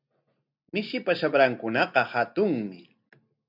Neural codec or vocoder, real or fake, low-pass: none; real; 5.4 kHz